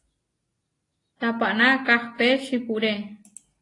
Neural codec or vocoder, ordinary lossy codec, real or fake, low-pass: none; AAC, 32 kbps; real; 10.8 kHz